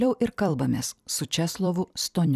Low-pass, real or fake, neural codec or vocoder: 14.4 kHz; fake; vocoder, 44.1 kHz, 128 mel bands every 256 samples, BigVGAN v2